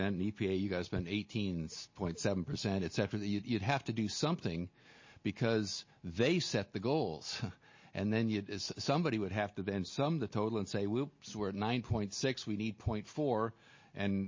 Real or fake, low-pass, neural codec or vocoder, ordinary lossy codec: real; 7.2 kHz; none; MP3, 32 kbps